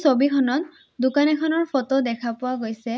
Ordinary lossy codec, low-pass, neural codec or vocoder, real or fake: none; none; none; real